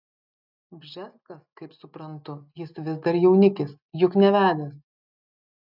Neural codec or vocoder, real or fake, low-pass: none; real; 5.4 kHz